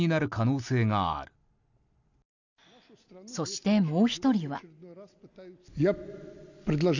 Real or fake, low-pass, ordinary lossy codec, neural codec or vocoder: real; 7.2 kHz; none; none